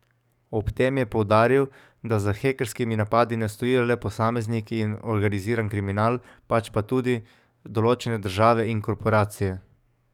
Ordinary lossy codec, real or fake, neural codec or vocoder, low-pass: none; fake; codec, 44.1 kHz, 7.8 kbps, DAC; 19.8 kHz